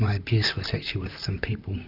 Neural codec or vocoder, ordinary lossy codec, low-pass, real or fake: vocoder, 44.1 kHz, 128 mel bands, Pupu-Vocoder; Opus, 64 kbps; 5.4 kHz; fake